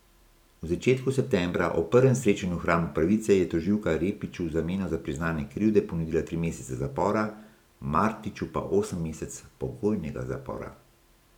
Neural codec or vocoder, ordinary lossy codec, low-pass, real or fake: vocoder, 44.1 kHz, 128 mel bands every 512 samples, BigVGAN v2; none; 19.8 kHz; fake